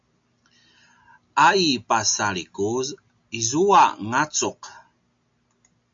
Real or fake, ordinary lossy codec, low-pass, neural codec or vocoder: real; MP3, 48 kbps; 7.2 kHz; none